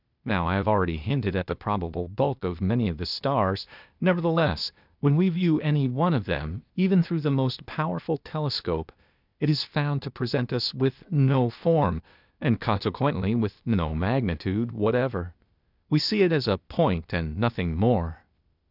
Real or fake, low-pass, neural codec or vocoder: fake; 5.4 kHz; codec, 16 kHz, 0.8 kbps, ZipCodec